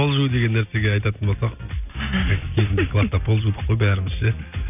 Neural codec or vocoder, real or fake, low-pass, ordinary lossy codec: none; real; 3.6 kHz; none